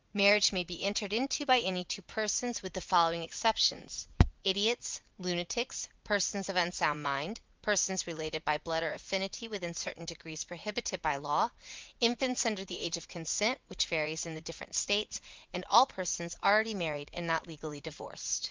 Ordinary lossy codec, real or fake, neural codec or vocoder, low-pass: Opus, 24 kbps; fake; vocoder, 44.1 kHz, 80 mel bands, Vocos; 7.2 kHz